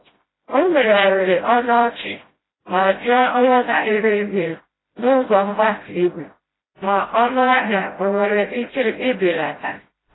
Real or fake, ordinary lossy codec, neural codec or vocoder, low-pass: fake; AAC, 16 kbps; codec, 16 kHz, 0.5 kbps, FreqCodec, smaller model; 7.2 kHz